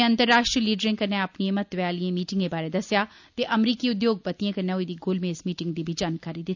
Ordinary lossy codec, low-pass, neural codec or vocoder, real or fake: none; 7.2 kHz; none; real